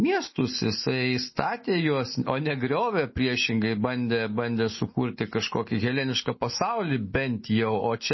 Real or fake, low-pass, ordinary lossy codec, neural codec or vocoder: real; 7.2 kHz; MP3, 24 kbps; none